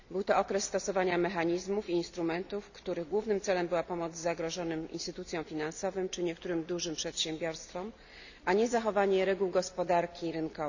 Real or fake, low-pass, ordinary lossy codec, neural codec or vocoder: real; 7.2 kHz; none; none